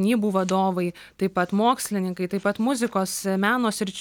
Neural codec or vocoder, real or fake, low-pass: none; real; 19.8 kHz